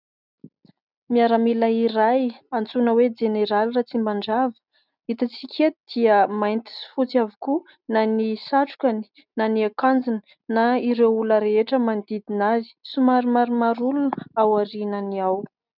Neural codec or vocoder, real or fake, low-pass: none; real; 5.4 kHz